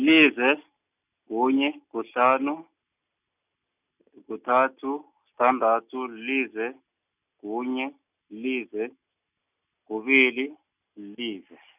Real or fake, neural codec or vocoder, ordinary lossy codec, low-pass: real; none; none; 3.6 kHz